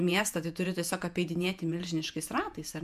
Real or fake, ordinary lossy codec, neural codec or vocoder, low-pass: fake; MP3, 96 kbps; vocoder, 48 kHz, 128 mel bands, Vocos; 14.4 kHz